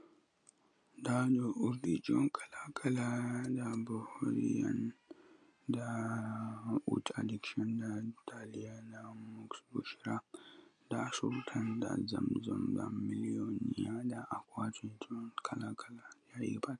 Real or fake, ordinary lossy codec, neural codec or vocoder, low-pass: real; MP3, 64 kbps; none; 9.9 kHz